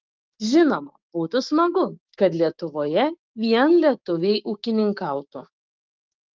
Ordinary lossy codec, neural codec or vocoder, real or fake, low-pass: Opus, 32 kbps; codec, 16 kHz, 6 kbps, DAC; fake; 7.2 kHz